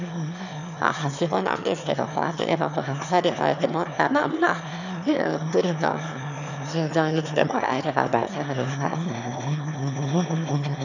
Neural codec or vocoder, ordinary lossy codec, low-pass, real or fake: autoencoder, 22.05 kHz, a latent of 192 numbers a frame, VITS, trained on one speaker; none; 7.2 kHz; fake